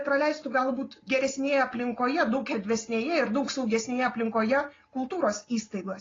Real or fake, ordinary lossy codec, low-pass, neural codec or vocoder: real; AAC, 32 kbps; 7.2 kHz; none